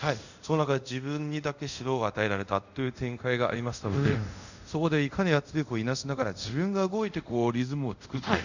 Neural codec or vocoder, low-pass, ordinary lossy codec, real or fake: codec, 24 kHz, 0.5 kbps, DualCodec; 7.2 kHz; none; fake